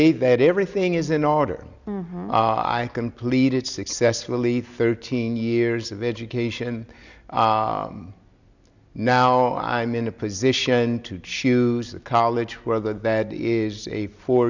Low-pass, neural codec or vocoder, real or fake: 7.2 kHz; none; real